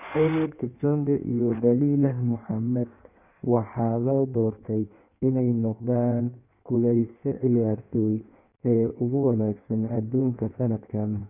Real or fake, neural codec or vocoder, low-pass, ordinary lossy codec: fake; codec, 16 kHz in and 24 kHz out, 1.1 kbps, FireRedTTS-2 codec; 3.6 kHz; none